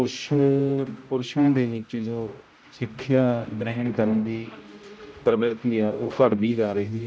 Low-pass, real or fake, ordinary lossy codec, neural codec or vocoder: none; fake; none; codec, 16 kHz, 0.5 kbps, X-Codec, HuBERT features, trained on general audio